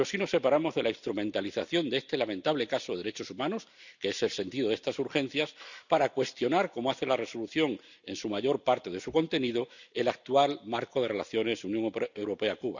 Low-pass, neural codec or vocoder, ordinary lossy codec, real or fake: 7.2 kHz; none; none; real